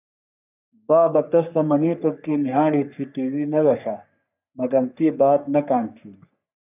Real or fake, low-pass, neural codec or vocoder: fake; 3.6 kHz; codec, 44.1 kHz, 3.4 kbps, Pupu-Codec